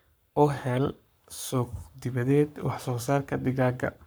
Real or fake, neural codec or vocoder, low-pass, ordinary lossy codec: fake; codec, 44.1 kHz, 7.8 kbps, Pupu-Codec; none; none